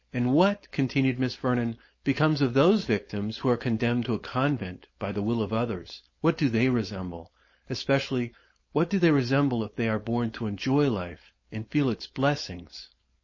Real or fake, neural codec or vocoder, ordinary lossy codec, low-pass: fake; codec, 16 kHz, 4.8 kbps, FACodec; MP3, 32 kbps; 7.2 kHz